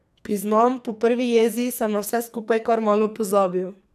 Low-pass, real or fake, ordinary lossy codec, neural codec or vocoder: 14.4 kHz; fake; none; codec, 44.1 kHz, 2.6 kbps, SNAC